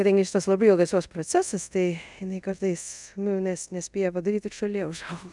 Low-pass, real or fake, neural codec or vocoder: 10.8 kHz; fake; codec, 24 kHz, 0.5 kbps, DualCodec